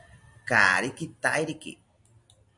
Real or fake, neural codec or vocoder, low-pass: real; none; 10.8 kHz